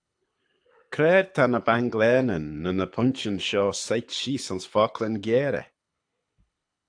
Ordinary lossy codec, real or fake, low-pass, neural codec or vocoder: AAC, 64 kbps; fake; 9.9 kHz; codec, 24 kHz, 6 kbps, HILCodec